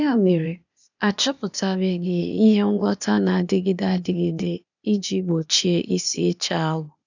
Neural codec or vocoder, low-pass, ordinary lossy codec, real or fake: codec, 16 kHz, 0.8 kbps, ZipCodec; 7.2 kHz; none; fake